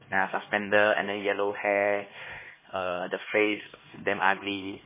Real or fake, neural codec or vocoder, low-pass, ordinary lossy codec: fake; codec, 16 kHz, 2 kbps, X-Codec, HuBERT features, trained on LibriSpeech; 3.6 kHz; MP3, 16 kbps